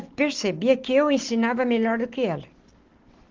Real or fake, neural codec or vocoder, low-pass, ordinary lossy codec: real; none; 7.2 kHz; Opus, 16 kbps